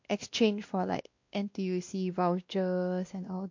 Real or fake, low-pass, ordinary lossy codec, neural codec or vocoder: fake; 7.2 kHz; MP3, 48 kbps; codec, 16 kHz, 1 kbps, X-Codec, WavLM features, trained on Multilingual LibriSpeech